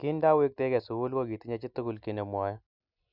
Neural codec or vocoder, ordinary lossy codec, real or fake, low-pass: none; none; real; 5.4 kHz